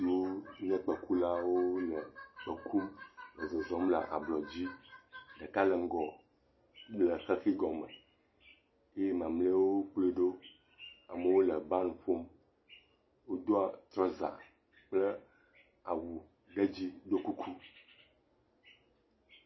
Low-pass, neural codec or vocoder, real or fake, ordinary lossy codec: 7.2 kHz; none; real; MP3, 24 kbps